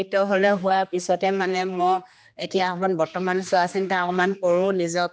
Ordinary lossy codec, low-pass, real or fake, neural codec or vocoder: none; none; fake; codec, 16 kHz, 2 kbps, X-Codec, HuBERT features, trained on general audio